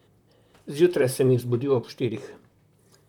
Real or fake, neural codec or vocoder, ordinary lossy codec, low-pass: fake; vocoder, 44.1 kHz, 128 mel bands, Pupu-Vocoder; none; 19.8 kHz